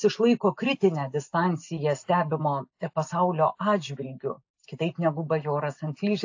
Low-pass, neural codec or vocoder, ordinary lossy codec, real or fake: 7.2 kHz; none; AAC, 48 kbps; real